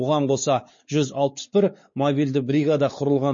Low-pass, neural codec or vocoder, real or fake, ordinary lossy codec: 7.2 kHz; codec, 16 kHz, 4 kbps, X-Codec, WavLM features, trained on Multilingual LibriSpeech; fake; MP3, 32 kbps